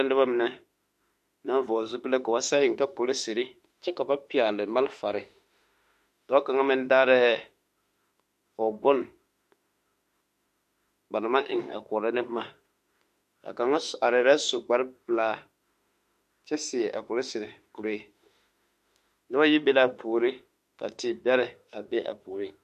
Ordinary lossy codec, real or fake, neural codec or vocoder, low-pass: MP3, 64 kbps; fake; autoencoder, 48 kHz, 32 numbers a frame, DAC-VAE, trained on Japanese speech; 14.4 kHz